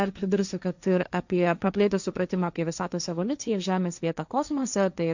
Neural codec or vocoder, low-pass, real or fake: codec, 16 kHz, 1.1 kbps, Voila-Tokenizer; 7.2 kHz; fake